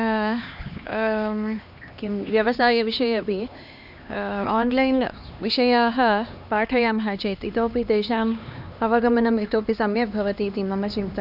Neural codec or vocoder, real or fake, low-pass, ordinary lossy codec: codec, 16 kHz, 2 kbps, X-Codec, HuBERT features, trained on LibriSpeech; fake; 5.4 kHz; none